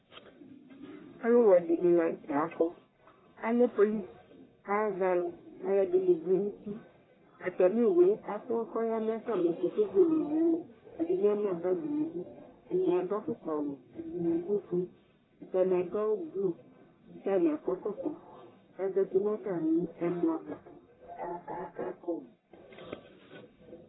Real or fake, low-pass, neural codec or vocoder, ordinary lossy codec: fake; 7.2 kHz; codec, 44.1 kHz, 1.7 kbps, Pupu-Codec; AAC, 16 kbps